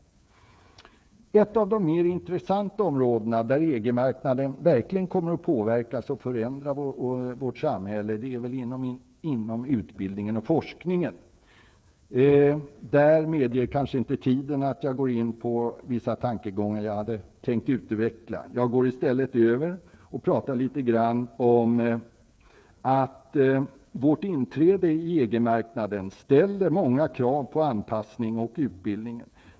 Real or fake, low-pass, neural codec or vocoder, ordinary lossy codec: fake; none; codec, 16 kHz, 8 kbps, FreqCodec, smaller model; none